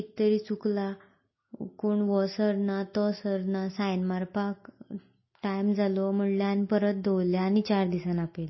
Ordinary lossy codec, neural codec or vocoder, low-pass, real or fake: MP3, 24 kbps; none; 7.2 kHz; real